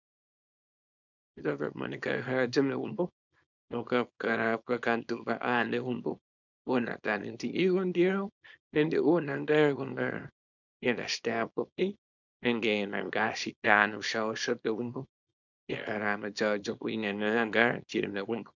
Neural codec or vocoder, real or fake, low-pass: codec, 24 kHz, 0.9 kbps, WavTokenizer, small release; fake; 7.2 kHz